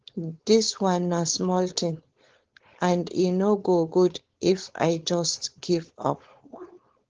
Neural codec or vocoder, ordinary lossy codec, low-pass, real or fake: codec, 16 kHz, 4.8 kbps, FACodec; Opus, 16 kbps; 7.2 kHz; fake